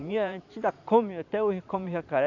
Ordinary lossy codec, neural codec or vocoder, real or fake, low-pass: none; vocoder, 44.1 kHz, 128 mel bands every 512 samples, BigVGAN v2; fake; 7.2 kHz